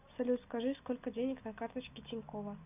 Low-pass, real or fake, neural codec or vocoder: 3.6 kHz; real; none